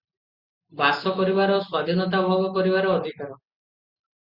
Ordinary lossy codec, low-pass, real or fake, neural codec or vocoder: Opus, 64 kbps; 5.4 kHz; real; none